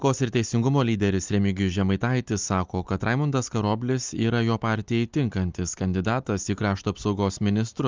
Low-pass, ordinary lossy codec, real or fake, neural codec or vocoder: 7.2 kHz; Opus, 24 kbps; real; none